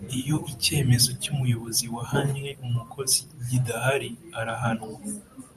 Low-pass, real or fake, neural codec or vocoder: 14.4 kHz; fake; vocoder, 44.1 kHz, 128 mel bands every 512 samples, BigVGAN v2